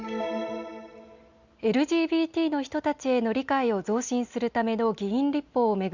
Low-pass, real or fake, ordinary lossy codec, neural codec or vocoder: 7.2 kHz; real; Opus, 64 kbps; none